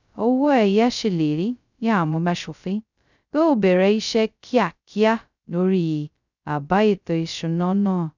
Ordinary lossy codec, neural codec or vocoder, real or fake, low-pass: none; codec, 16 kHz, 0.2 kbps, FocalCodec; fake; 7.2 kHz